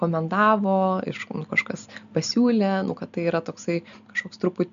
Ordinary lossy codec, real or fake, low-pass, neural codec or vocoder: AAC, 96 kbps; real; 7.2 kHz; none